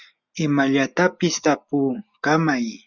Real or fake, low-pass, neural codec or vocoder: real; 7.2 kHz; none